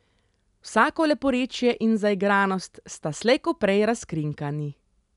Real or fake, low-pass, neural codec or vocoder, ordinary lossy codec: real; 10.8 kHz; none; none